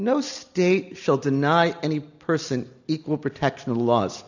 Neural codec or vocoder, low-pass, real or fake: none; 7.2 kHz; real